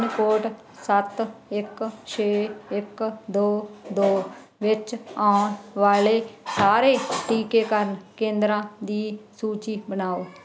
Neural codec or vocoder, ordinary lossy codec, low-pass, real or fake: none; none; none; real